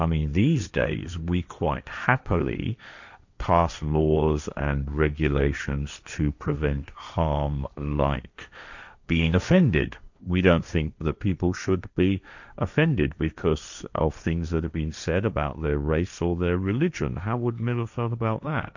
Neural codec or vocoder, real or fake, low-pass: codec, 16 kHz, 1.1 kbps, Voila-Tokenizer; fake; 7.2 kHz